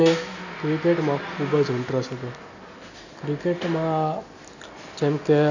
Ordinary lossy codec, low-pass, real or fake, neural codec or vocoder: none; 7.2 kHz; real; none